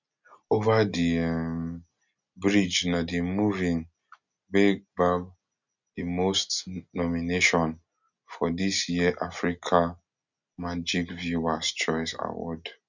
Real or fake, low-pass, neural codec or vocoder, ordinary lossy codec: real; 7.2 kHz; none; none